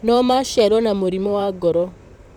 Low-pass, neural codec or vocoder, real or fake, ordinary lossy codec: 19.8 kHz; vocoder, 44.1 kHz, 128 mel bands, Pupu-Vocoder; fake; none